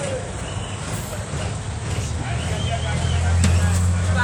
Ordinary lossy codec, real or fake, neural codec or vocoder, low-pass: none; real; none; 19.8 kHz